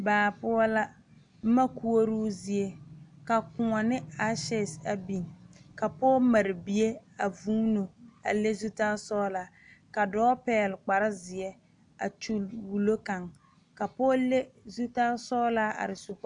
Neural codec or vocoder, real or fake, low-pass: none; real; 9.9 kHz